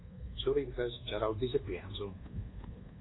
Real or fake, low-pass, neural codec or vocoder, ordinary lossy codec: fake; 7.2 kHz; codec, 24 kHz, 1.2 kbps, DualCodec; AAC, 16 kbps